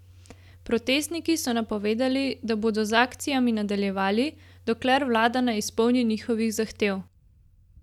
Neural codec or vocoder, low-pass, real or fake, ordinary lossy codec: none; 19.8 kHz; real; none